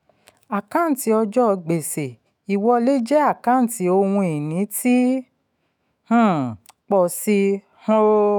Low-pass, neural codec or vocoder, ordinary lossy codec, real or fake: none; autoencoder, 48 kHz, 128 numbers a frame, DAC-VAE, trained on Japanese speech; none; fake